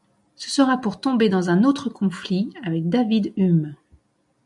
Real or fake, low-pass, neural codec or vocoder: real; 10.8 kHz; none